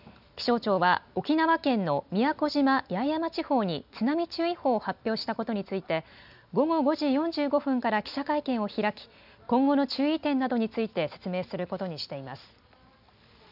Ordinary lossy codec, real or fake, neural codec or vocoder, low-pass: none; real; none; 5.4 kHz